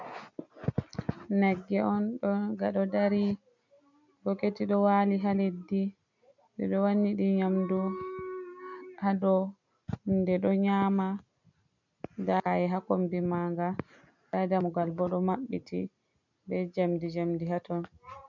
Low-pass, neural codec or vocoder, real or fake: 7.2 kHz; none; real